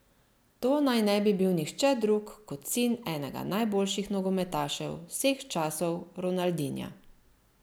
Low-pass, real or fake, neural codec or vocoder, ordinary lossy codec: none; real; none; none